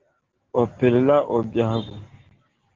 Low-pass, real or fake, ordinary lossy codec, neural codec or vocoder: 7.2 kHz; real; Opus, 16 kbps; none